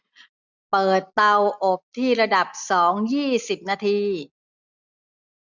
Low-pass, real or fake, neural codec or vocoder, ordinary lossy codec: 7.2 kHz; real; none; none